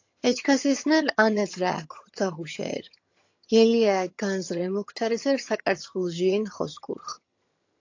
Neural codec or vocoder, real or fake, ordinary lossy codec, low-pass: vocoder, 22.05 kHz, 80 mel bands, HiFi-GAN; fake; AAC, 48 kbps; 7.2 kHz